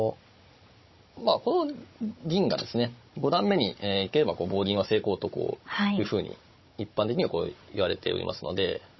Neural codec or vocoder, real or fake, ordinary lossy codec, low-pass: codec, 16 kHz, 16 kbps, FunCodec, trained on Chinese and English, 50 frames a second; fake; MP3, 24 kbps; 7.2 kHz